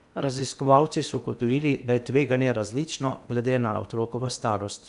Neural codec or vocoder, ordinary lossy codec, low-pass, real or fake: codec, 16 kHz in and 24 kHz out, 0.8 kbps, FocalCodec, streaming, 65536 codes; none; 10.8 kHz; fake